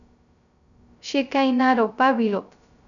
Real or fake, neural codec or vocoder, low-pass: fake; codec, 16 kHz, 0.2 kbps, FocalCodec; 7.2 kHz